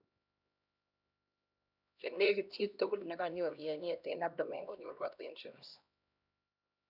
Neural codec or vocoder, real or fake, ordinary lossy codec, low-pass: codec, 16 kHz, 1 kbps, X-Codec, HuBERT features, trained on LibriSpeech; fake; none; 5.4 kHz